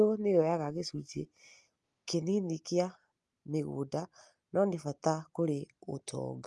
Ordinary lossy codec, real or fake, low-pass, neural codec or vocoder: Opus, 32 kbps; real; 10.8 kHz; none